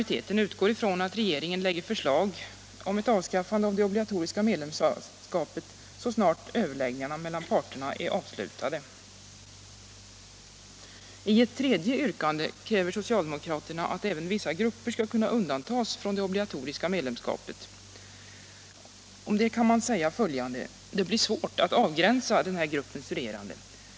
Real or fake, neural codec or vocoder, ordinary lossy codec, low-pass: real; none; none; none